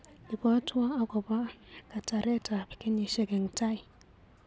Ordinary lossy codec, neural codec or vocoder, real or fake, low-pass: none; none; real; none